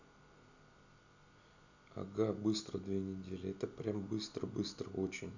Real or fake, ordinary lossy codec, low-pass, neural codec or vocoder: real; none; 7.2 kHz; none